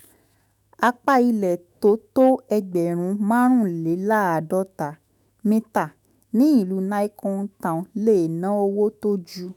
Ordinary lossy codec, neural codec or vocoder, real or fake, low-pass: none; autoencoder, 48 kHz, 128 numbers a frame, DAC-VAE, trained on Japanese speech; fake; 19.8 kHz